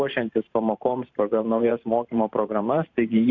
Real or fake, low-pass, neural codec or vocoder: fake; 7.2 kHz; vocoder, 44.1 kHz, 128 mel bands every 512 samples, BigVGAN v2